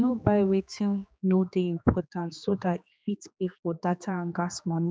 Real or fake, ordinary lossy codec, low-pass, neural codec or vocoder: fake; none; none; codec, 16 kHz, 2 kbps, X-Codec, HuBERT features, trained on general audio